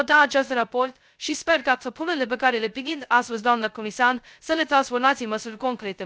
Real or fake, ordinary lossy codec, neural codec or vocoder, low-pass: fake; none; codec, 16 kHz, 0.2 kbps, FocalCodec; none